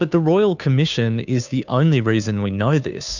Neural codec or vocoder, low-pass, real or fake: codec, 16 kHz, 2 kbps, FunCodec, trained on Chinese and English, 25 frames a second; 7.2 kHz; fake